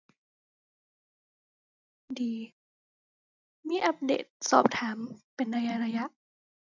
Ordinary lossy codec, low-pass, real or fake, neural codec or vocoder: none; 7.2 kHz; real; none